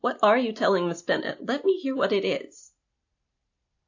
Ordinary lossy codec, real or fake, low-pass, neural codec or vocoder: AAC, 48 kbps; real; 7.2 kHz; none